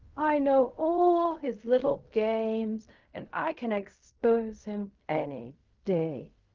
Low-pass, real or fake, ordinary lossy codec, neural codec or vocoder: 7.2 kHz; fake; Opus, 32 kbps; codec, 16 kHz in and 24 kHz out, 0.4 kbps, LongCat-Audio-Codec, fine tuned four codebook decoder